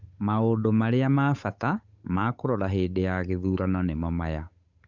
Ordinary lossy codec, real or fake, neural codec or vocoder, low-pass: Opus, 64 kbps; fake; codec, 16 kHz, 8 kbps, FunCodec, trained on Chinese and English, 25 frames a second; 7.2 kHz